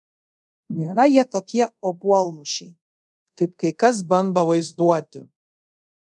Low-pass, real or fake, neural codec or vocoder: 10.8 kHz; fake; codec, 24 kHz, 0.5 kbps, DualCodec